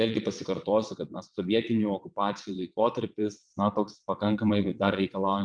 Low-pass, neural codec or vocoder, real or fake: 9.9 kHz; vocoder, 22.05 kHz, 80 mel bands, WaveNeXt; fake